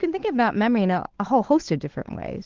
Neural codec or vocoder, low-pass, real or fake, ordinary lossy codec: codec, 16 kHz, 8 kbps, FunCodec, trained on Chinese and English, 25 frames a second; 7.2 kHz; fake; Opus, 16 kbps